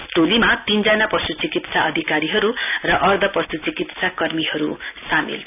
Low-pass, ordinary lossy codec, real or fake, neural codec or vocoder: 3.6 kHz; none; real; none